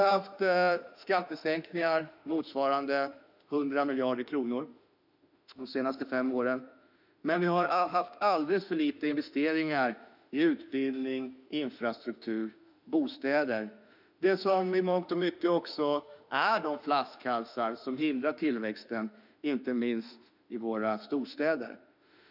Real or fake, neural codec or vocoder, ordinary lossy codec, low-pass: fake; autoencoder, 48 kHz, 32 numbers a frame, DAC-VAE, trained on Japanese speech; none; 5.4 kHz